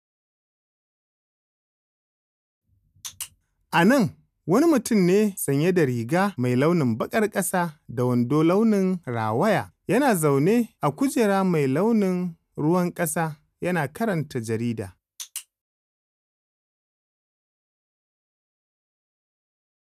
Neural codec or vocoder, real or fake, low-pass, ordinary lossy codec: none; real; 14.4 kHz; none